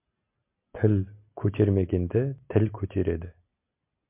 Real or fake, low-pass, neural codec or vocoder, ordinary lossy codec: real; 3.6 kHz; none; MP3, 32 kbps